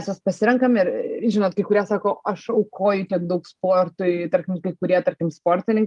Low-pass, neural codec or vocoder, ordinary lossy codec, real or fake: 10.8 kHz; none; Opus, 32 kbps; real